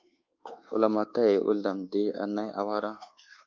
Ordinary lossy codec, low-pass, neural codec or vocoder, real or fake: Opus, 24 kbps; 7.2 kHz; codec, 24 kHz, 1.2 kbps, DualCodec; fake